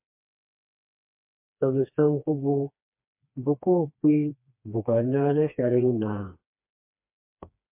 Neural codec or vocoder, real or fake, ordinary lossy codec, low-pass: codec, 16 kHz, 2 kbps, FreqCodec, smaller model; fake; MP3, 32 kbps; 3.6 kHz